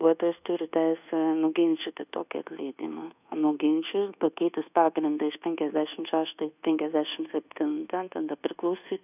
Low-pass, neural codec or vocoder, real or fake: 3.6 kHz; codec, 24 kHz, 1.2 kbps, DualCodec; fake